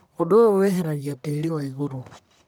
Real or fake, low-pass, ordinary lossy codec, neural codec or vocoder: fake; none; none; codec, 44.1 kHz, 1.7 kbps, Pupu-Codec